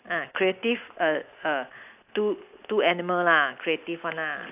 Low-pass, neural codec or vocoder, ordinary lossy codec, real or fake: 3.6 kHz; none; AAC, 32 kbps; real